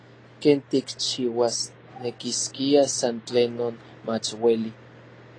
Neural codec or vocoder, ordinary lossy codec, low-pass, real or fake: none; AAC, 32 kbps; 9.9 kHz; real